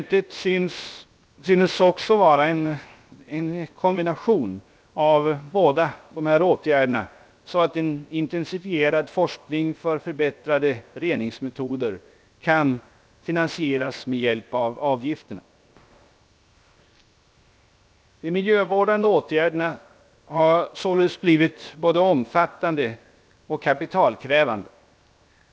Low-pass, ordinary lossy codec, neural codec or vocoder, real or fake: none; none; codec, 16 kHz, 0.7 kbps, FocalCodec; fake